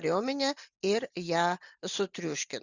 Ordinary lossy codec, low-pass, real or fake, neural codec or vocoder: Opus, 64 kbps; 7.2 kHz; real; none